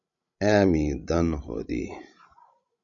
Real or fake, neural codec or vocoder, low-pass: fake; codec, 16 kHz, 16 kbps, FreqCodec, larger model; 7.2 kHz